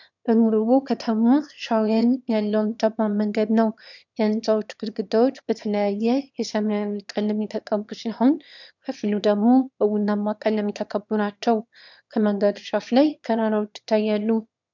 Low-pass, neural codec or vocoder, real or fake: 7.2 kHz; autoencoder, 22.05 kHz, a latent of 192 numbers a frame, VITS, trained on one speaker; fake